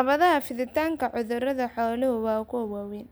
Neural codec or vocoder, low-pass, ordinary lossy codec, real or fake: none; none; none; real